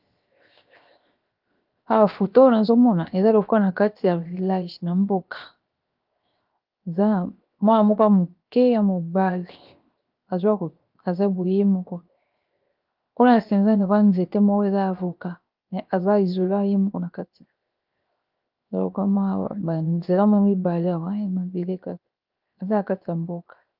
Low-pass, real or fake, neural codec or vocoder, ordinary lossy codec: 5.4 kHz; fake; codec, 16 kHz, 0.7 kbps, FocalCodec; Opus, 32 kbps